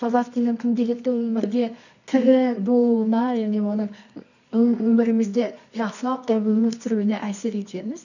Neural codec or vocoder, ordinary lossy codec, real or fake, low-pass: codec, 24 kHz, 0.9 kbps, WavTokenizer, medium music audio release; none; fake; 7.2 kHz